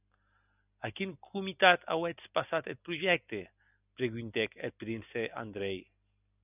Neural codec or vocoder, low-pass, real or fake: none; 3.6 kHz; real